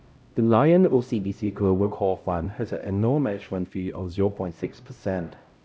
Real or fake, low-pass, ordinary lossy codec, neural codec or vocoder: fake; none; none; codec, 16 kHz, 0.5 kbps, X-Codec, HuBERT features, trained on LibriSpeech